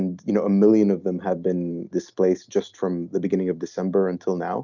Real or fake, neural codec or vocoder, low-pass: real; none; 7.2 kHz